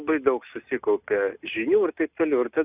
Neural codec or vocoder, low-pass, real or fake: none; 3.6 kHz; real